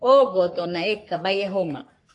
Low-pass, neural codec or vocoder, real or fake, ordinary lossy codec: 10.8 kHz; codec, 44.1 kHz, 3.4 kbps, Pupu-Codec; fake; AAC, 48 kbps